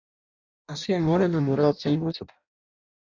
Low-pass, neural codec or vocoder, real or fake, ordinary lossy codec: 7.2 kHz; codec, 16 kHz in and 24 kHz out, 0.6 kbps, FireRedTTS-2 codec; fake; AAC, 48 kbps